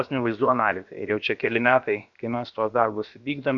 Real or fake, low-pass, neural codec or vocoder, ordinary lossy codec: fake; 7.2 kHz; codec, 16 kHz, about 1 kbps, DyCAST, with the encoder's durations; AAC, 64 kbps